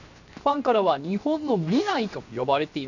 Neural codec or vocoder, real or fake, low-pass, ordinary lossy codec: codec, 16 kHz, 0.7 kbps, FocalCodec; fake; 7.2 kHz; none